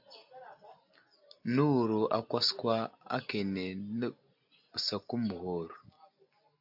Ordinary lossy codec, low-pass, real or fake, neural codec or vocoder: AAC, 48 kbps; 5.4 kHz; real; none